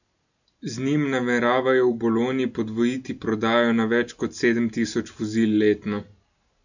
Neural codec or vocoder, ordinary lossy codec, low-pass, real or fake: none; none; 7.2 kHz; real